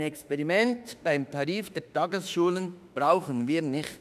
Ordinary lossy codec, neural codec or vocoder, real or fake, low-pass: none; autoencoder, 48 kHz, 32 numbers a frame, DAC-VAE, trained on Japanese speech; fake; 14.4 kHz